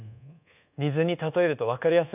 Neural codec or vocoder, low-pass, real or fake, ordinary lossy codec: codec, 24 kHz, 1.2 kbps, DualCodec; 3.6 kHz; fake; none